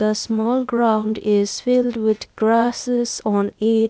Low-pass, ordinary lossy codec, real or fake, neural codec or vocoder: none; none; fake; codec, 16 kHz, 0.8 kbps, ZipCodec